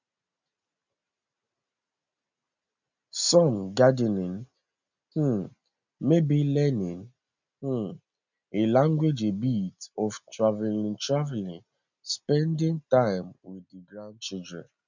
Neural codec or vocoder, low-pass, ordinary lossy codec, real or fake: none; 7.2 kHz; none; real